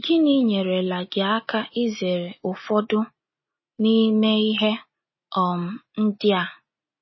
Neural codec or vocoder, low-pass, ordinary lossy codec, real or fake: none; 7.2 kHz; MP3, 24 kbps; real